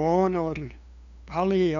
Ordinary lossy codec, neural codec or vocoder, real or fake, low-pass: none; codec, 16 kHz, 2 kbps, FunCodec, trained on LibriTTS, 25 frames a second; fake; 7.2 kHz